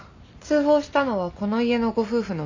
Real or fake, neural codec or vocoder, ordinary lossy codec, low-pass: real; none; AAC, 48 kbps; 7.2 kHz